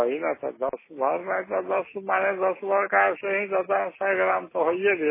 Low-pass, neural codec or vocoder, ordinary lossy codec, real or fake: 3.6 kHz; none; MP3, 16 kbps; real